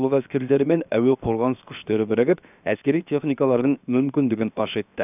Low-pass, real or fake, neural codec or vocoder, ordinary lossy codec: 3.6 kHz; fake; codec, 16 kHz, 0.7 kbps, FocalCodec; none